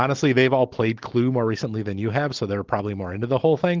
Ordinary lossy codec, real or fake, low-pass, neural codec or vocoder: Opus, 16 kbps; real; 7.2 kHz; none